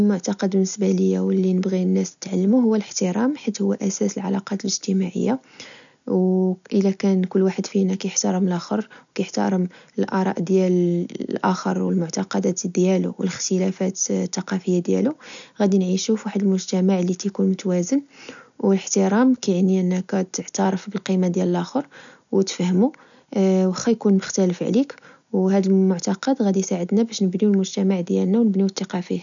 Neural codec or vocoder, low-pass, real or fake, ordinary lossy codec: none; 7.2 kHz; real; none